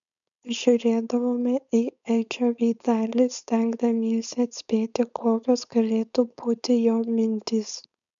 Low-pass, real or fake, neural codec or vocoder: 7.2 kHz; fake; codec, 16 kHz, 4.8 kbps, FACodec